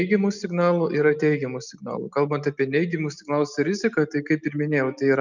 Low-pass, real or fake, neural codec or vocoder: 7.2 kHz; real; none